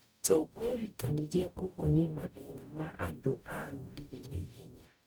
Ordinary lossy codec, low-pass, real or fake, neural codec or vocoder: none; none; fake; codec, 44.1 kHz, 0.9 kbps, DAC